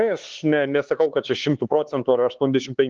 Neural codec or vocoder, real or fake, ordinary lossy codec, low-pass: codec, 16 kHz, 4 kbps, X-Codec, HuBERT features, trained on LibriSpeech; fake; Opus, 16 kbps; 7.2 kHz